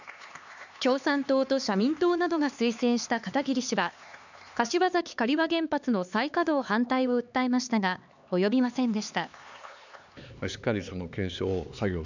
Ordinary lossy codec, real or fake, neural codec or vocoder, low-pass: none; fake; codec, 16 kHz, 4 kbps, X-Codec, HuBERT features, trained on LibriSpeech; 7.2 kHz